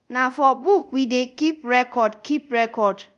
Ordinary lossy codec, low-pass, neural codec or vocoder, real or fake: none; 10.8 kHz; codec, 24 kHz, 0.5 kbps, DualCodec; fake